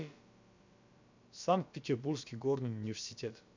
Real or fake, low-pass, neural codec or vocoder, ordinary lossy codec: fake; 7.2 kHz; codec, 16 kHz, about 1 kbps, DyCAST, with the encoder's durations; MP3, 64 kbps